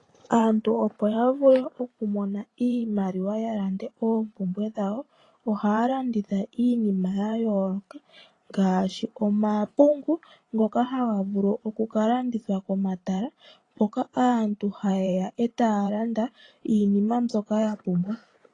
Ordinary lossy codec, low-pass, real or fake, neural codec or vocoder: AAC, 32 kbps; 10.8 kHz; fake; vocoder, 44.1 kHz, 128 mel bands every 512 samples, BigVGAN v2